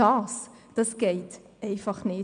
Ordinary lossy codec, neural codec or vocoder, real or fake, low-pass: none; none; real; 9.9 kHz